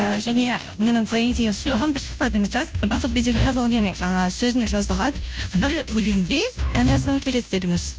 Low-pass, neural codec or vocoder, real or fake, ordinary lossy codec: none; codec, 16 kHz, 0.5 kbps, FunCodec, trained on Chinese and English, 25 frames a second; fake; none